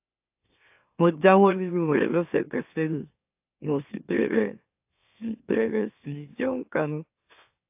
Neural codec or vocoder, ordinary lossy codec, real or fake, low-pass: autoencoder, 44.1 kHz, a latent of 192 numbers a frame, MeloTTS; AAC, 32 kbps; fake; 3.6 kHz